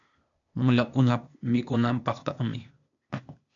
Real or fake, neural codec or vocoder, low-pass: fake; codec, 16 kHz, 0.8 kbps, ZipCodec; 7.2 kHz